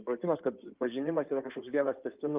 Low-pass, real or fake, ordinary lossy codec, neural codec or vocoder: 3.6 kHz; fake; Opus, 24 kbps; codec, 16 kHz in and 24 kHz out, 2.2 kbps, FireRedTTS-2 codec